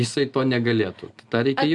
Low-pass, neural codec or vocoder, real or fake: 10.8 kHz; none; real